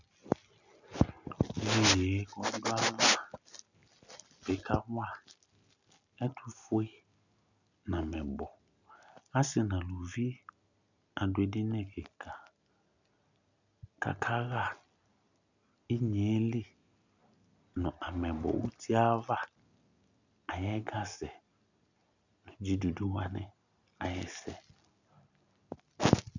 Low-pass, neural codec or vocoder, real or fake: 7.2 kHz; none; real